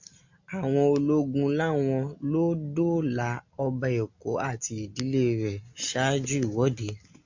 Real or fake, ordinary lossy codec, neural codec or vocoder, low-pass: real; MP3, 64 kbps; none; 7.2 kHz